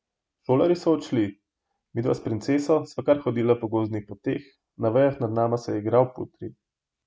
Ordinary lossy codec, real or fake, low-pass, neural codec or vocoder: none; real; none; none